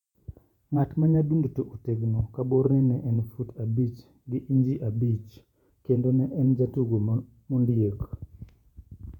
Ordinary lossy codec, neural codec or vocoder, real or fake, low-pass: none; vocoder, 48 kHz, 128 mel bands, Vocos; fake; 19.8 kHz